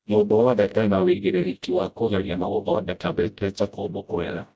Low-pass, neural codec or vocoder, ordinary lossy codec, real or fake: none; codec, 16 kHz, 0.5 kbps, FreqCodec, smaller model; none; fake